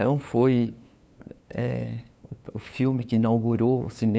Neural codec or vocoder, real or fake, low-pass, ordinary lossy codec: codec, 16 kHz, 2 kbps, FunCodec, trained on LibriTTS, 25 frames a second; fake; none; none